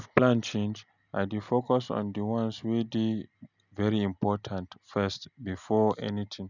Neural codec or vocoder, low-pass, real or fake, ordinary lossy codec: none; 7.2 kHz; real; none